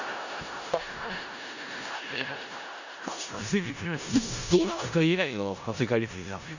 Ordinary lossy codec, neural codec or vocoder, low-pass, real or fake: none; codec, 16 kHz in and 24 kHz out, 0.4 kbps, LongCat-Audio-Codec, four codebook decoder; 7.2 kHz; fake